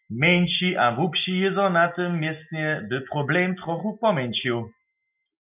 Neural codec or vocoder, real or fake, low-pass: none; real; 3.6 kHz